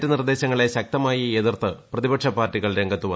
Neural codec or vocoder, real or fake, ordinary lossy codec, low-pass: none; real; none; none